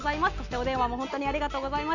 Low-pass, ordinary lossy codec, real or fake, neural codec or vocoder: 7.2 kHz; none; real; none